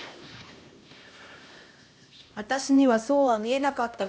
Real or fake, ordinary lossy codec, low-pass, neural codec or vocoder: fake; none; none; codec, 16 kHz, 1 kbps, X-Codec, HuBERT features, trained on LibriSpeech